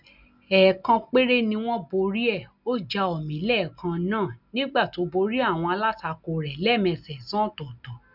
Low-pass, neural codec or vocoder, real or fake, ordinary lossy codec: 5.4 kHz; none; real; none